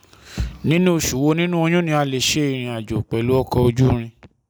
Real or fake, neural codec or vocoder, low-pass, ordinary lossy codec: real; none; none; none